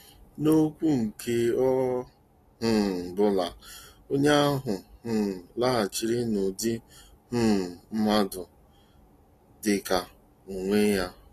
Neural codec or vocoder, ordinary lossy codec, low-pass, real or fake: none; AAC, 48 kbps; 14.4 kHz; real